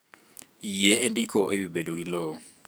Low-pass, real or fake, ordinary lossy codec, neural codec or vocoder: none; fake; none; codec, 44.1 kHz, 2.6 kbps, SNAC